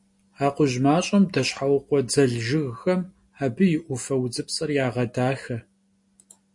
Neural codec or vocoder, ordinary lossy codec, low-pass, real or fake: none; MP3, 48 kbps; 10.8 kHz; real